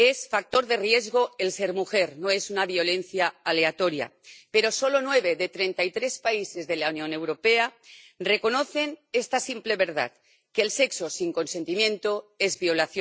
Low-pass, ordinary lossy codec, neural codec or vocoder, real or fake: none; none; none; real